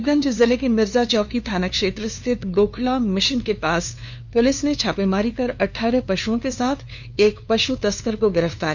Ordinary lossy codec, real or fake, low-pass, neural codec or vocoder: none; fake; 7.2 kHz; codec, 16 kHz, 2 kbps, FunCodec, trained on LibriTTS, 25 frames a second